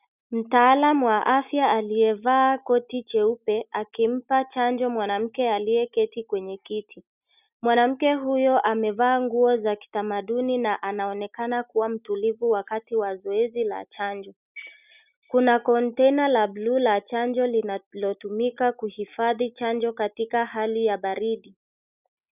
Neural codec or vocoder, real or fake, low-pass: none; real; 3.6 kHz